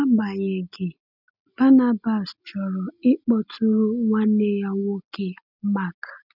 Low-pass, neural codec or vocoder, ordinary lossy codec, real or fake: 5.4 kHz; none; none; real